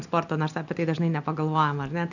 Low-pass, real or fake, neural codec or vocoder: 7.2 kHz; real; none